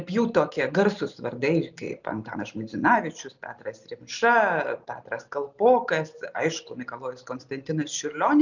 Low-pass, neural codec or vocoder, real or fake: 7.2 kHz; none; real